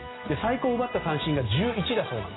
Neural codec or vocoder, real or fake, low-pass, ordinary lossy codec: none; real; 7.2 kHz; AAC, 16 kbps